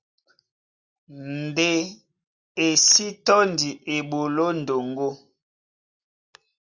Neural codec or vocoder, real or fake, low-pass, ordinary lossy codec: none; real; 7.2 kHz; Opus, 64 kbps